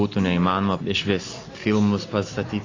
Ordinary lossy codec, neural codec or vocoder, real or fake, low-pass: AAC, 32 kbps; none; real; 7.2 kHz